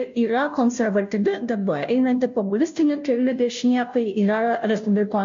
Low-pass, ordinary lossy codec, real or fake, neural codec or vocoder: 7.2 kHz; MP3, 48 kbps; fake; codec, 16 kHz, 0.5 kbps, FunCodec, trained on Chinese and English, 25 frames a second